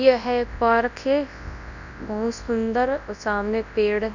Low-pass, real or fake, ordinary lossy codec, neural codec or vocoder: 7.2 kHz; fake; none; codec, 24 kHz, 0.9 kbps, WavTokenizer, large speech release